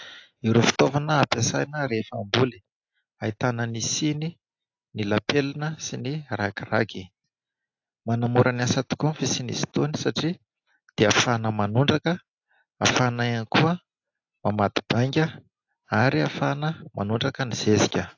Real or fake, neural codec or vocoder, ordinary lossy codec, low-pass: real; none; AAC, 48 kbps; 7.2 kHz